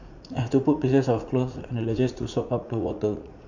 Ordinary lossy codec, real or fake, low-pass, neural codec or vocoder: none; fake; 7.2 kHz; vocoder, 44.1 kHz, 80 mel bands, Vocos